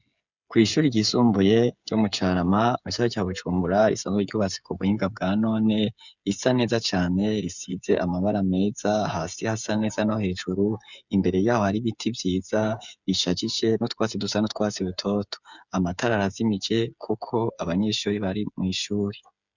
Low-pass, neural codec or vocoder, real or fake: 7.2 kHz; codec, 16 kHz, 8 kbps, FreqCodec, smaller model; fake